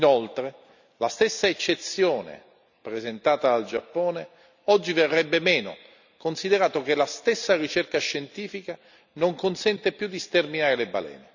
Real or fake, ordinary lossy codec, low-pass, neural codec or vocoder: real; none; 7.2 kHz; none